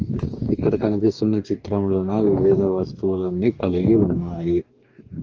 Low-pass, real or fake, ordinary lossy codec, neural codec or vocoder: 7.2 kHz; fake; Opus, 24 kbps; codec, 44.1 kHz, 2.6 kbps, DAC